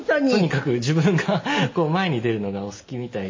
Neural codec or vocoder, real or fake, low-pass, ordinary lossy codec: none; real; 7.2 kHz; MP3, 32 kbps